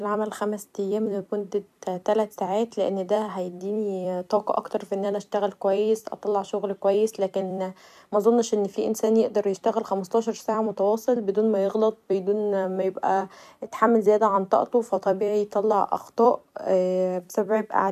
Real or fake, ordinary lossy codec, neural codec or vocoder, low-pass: fake; none; vocoder, 44.1 kHz, 128 mel bands every 256 samples, BigVGAN v2; 14.4 kHz